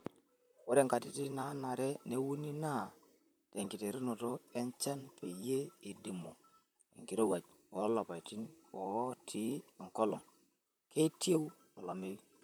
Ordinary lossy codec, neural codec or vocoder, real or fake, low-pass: none; vocoder, 44.1 kHz, 128 mel bands, Pupu-Vocoder; fake; none